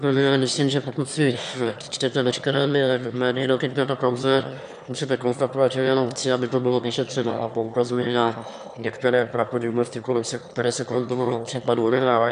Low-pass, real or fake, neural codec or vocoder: 9.9 kHz; fake; autoencoder, 22.05 kHz, a latent of 192 numbers a frame, VITS, trained on one speaker